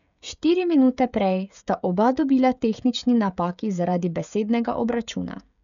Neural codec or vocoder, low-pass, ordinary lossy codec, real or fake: codec, 16 kHz, 16 kbps, FreqCodec, smaller model; 7.2 kHz; none; fake